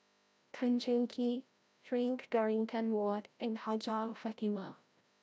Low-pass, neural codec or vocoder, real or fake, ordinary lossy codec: none; codec, 16 kHz, 0.5 kbps, FreqCodec, larger model; fake; none